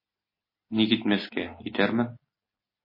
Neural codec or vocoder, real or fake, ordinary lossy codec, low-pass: none; real; MP3, 24 kbps; 5.4 kHz